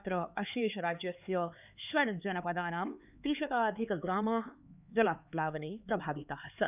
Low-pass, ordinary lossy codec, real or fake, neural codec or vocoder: 3.6 kHz; none; fake; codec, 16 kHz, 4 kbps, X-Codec, HuBERT features, trained on LibriSpeech